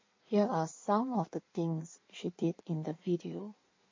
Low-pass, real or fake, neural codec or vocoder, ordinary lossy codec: 7.2 kHz; fake; codec, 16 kHz in and 24 kHz out, 1.1 kbps, FireRedTTS-2 codec; MP3, 32 kbps